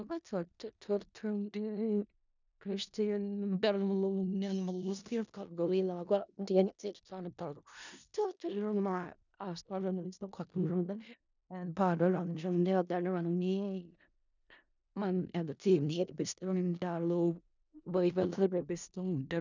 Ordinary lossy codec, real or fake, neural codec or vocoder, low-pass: none; fake; codec, 16 kHz in and 24 kHz out, 0.4 kbps, LongCat-Audio-Codec, four codebook decoder; 7.2 kHz